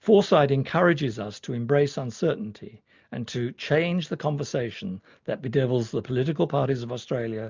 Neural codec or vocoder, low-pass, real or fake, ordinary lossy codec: none; 7.2 kHz; real; MP3, 64 kbps